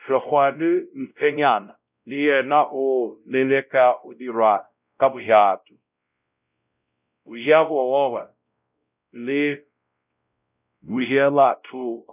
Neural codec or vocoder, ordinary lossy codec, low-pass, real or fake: codec, 16 kHz, 0.5 kbps, X-Codec, WavLM features, trained on Multilingual LibriSpeech; none; 3.6 kHz; fake